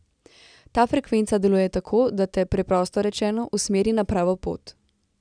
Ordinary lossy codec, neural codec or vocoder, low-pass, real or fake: none; none; 9.9 kHz; real